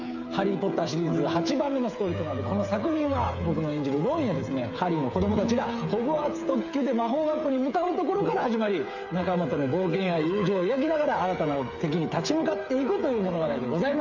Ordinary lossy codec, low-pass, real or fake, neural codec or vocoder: none; 7.2 kHz; fake; codec, 16 kHz, 8 kbps, FreqCodec, smaller model